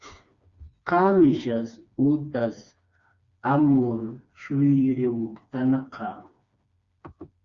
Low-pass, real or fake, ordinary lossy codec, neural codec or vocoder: 7.2 kHz; fake; Opus, 64 kbps; codec, 16 kHz, 2 kbps, FreqCodec, smaller model